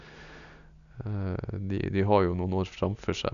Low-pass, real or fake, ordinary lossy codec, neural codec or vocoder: 7.2 kHz; real; none; none